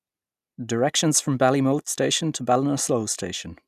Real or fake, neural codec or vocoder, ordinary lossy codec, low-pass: real; none; none; 14.4 kHz